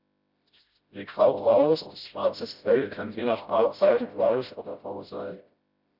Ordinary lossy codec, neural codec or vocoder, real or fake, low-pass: Opus, 64 kbps; codec, 16 kHz, 0.5 kbps, FreqCodec, smaller model; fake; 5.4 kHz